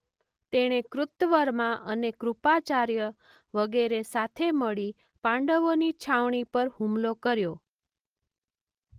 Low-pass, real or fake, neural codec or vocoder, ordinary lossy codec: 14.4 kHz; real; none; Opus, 24 kbps